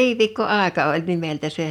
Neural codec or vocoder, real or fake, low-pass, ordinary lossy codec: none; real; 19.8 kHz; none